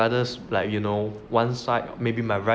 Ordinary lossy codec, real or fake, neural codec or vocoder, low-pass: none; real; none; none